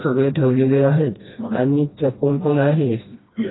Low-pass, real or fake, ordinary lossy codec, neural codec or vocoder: 7.2 kHz; fake; AAC, 16 kbps; codec, 16 kHz, 1 kbps, FreqCodec, smaller model